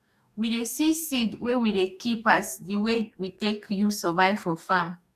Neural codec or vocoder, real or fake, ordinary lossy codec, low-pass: codec, 44.1 kHz, 2.6 kbps, DAC; fake; none; 14.4 kHz